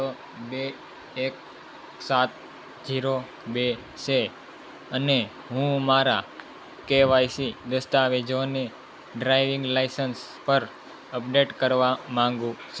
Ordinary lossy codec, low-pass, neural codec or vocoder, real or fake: none; none; none; real